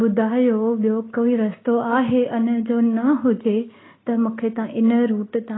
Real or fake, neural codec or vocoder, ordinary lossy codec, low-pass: real; none; AAC, 16 kbps; 7.2 kHz